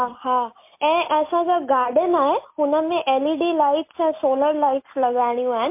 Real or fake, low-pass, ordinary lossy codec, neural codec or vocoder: real; 3.6 kHz; MP3, 24 kbps; none